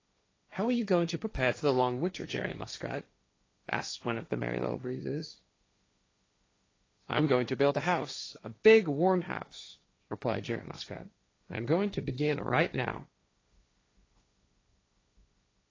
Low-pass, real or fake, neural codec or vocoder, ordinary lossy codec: 7.2 kHz; fake; codec, 16 kHz, 1.1 kbps, Voila-Tokenizer; AAC, 32 kbps